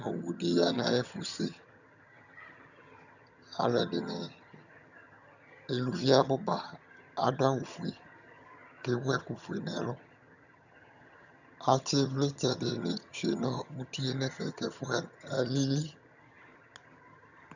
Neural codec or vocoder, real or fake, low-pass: vocoder, 22.05 kHz, 80 mel bands, HiFi-GAN; fake; 7.2 kHz